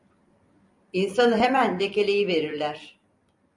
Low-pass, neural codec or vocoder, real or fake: 10.8 kHz; vocoder, 44.1 kHz, 128 mel bands every 256 samples, BigVGAN v2; fake